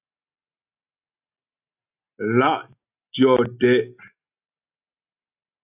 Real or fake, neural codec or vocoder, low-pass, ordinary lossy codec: real; none; 3.6 kHz; AAC, 32 kbps